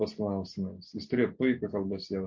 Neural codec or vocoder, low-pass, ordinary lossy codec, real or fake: none; 7.2 kHz; MP3, 48 kbps; real